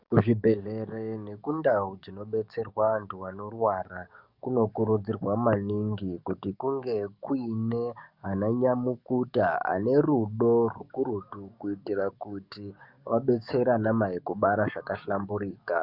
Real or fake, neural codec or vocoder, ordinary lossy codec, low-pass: fake; codec, 44.1 kHz, 7.8 kbps, DAC; Opus, 64 kbps; 5.4 kHz